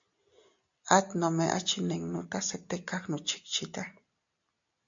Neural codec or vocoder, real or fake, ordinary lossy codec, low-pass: none; real; AAC, 64 kbps; 7.2 kHz